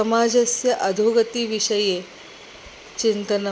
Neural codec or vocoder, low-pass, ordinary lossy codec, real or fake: none; none; none; real